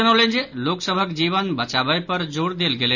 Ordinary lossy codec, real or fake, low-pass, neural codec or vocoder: none; real; 7.2 kHz; none